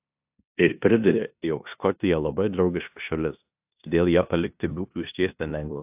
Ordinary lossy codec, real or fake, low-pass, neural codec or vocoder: AAC, 32 kbps; fake; 3.6 kHz; codec, 16 kHz in and 24 kHz out, 0.9 kbps, LongCat-Audio-Codec, four codebook decoder